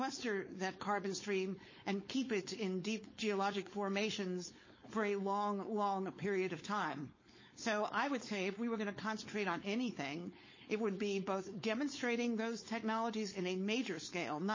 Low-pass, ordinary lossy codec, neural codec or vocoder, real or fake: 7.2 kHz; MP3, 32 kbps; codec, 16 kHz, 4.8 kbps, FACodec; fake